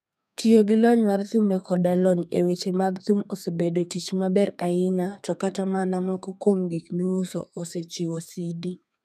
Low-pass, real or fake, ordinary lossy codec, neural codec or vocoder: 14.4 kHz; fake; none; codec, 32 kHz, 1.9 kbps, SNAC